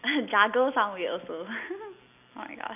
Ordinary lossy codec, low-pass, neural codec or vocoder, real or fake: none; 3.6 kHz; none; real